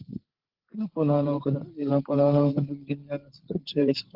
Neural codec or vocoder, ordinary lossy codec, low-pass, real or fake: codec, 16 kHz, 8 kbps, FreqCodec, larger model; Opus, 16 kbps; 5.4 kHz; fake